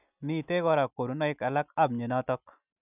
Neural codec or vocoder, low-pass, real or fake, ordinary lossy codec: none; 3.6 kHz; real; none